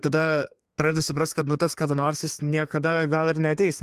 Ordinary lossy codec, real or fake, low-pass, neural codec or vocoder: Opus, 32 kbps; fake; 14.4 kHz; codec, 32 kHz, 1.9 kbps, SNAC